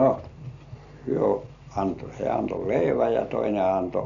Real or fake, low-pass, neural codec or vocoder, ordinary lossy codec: real; 7.2 kHz; none; none